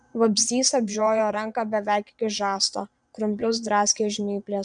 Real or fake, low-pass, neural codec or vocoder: fake; 9.9 kHz; vocoder, 22.05 kHz, 80 mel bands, Vocos